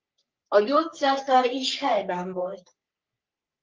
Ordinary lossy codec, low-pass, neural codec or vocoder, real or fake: Opus, 24 kbps; 7.2 kHz; codec, 44.1 kHz, 3.4 kbps, Pupu-Codec; fake